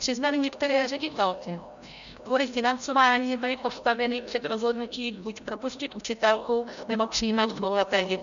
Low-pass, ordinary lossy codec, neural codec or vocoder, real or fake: 7.2 kHz; MP3, 96 kbps; codec, 16 kHz, 0.5 kbps, FreqCodec, larger model; fake